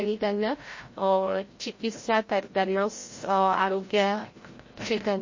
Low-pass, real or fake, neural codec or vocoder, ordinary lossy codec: 7.2 kHz; fake; codec, 16 kHz, 0.5 kbps, FreqCodec, larger model; MP3, 32 kbps